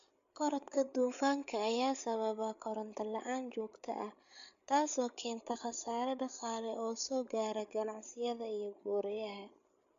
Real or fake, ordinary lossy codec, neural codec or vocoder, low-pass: fake; AAC, 64 kbps; codec, 16 kHz, 8 kbps, FreqCodec, larger model; 7.2 kHz